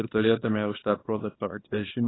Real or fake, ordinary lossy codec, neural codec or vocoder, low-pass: fake; AAC, 16 kbps; codec, 24 kHz, 0.9 kbps, WavTokenizer, small release; 7.2 kHz